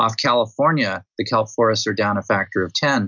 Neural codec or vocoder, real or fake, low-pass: none; real; 7.2 kHz